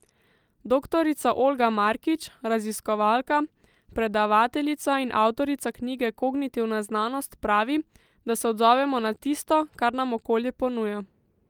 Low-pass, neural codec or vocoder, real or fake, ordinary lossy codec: 19.8 kHz; none; real; Opus, 32 kbps